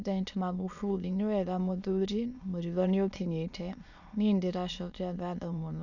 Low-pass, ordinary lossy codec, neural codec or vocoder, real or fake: 7.2 kHz; none; autoencoder, 22.05 kHz, a latent of 192 numbers a frame, VITS, trained on many speakers; fake